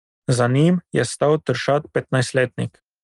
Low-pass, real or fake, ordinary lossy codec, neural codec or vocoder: 10.8 kHz; real; Opus, 32 kbps; none